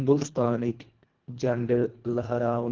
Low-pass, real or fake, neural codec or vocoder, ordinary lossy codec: 7.2 kHz; fake; codec, 24 kHz, 1.5 kbps, HILCodec; Opus, 16 kbps